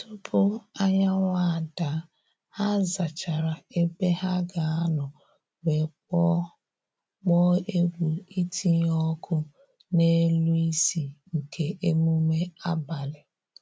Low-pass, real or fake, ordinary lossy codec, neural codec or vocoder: none; real; none; none